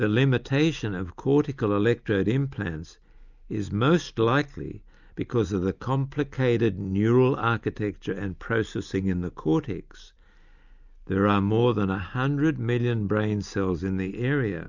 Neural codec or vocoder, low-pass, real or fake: none; 7.2 kHz; real